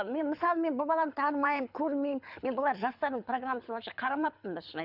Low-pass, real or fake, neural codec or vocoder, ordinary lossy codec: 5.4 kHz; fake; codec, 24 kHz, 6 kbps, HILCodec; none